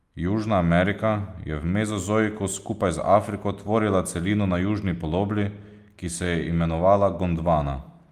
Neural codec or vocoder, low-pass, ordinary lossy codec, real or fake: none; 14.4 kHz; Opus, 32 kbps; real